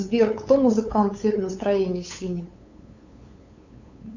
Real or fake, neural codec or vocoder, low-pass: fake; codec, 16 kHz, 8 kbps, FunCodec, trained on LibriTTS, 25 frames a second; 7.2 kHz